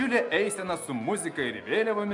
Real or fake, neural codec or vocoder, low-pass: real; none; 10.8 kHz